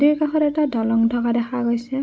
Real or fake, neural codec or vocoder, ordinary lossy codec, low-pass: real; none; none; none